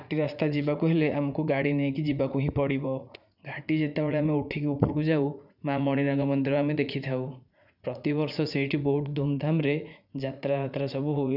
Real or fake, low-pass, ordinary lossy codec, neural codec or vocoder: fake; 5.4 kHz; none; vocoder, 44.1 kHz, 80 mel bands, Vocos